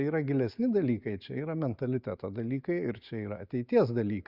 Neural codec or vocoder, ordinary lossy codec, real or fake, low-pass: none; Opus, 64 kbps; real; 5.4 kHz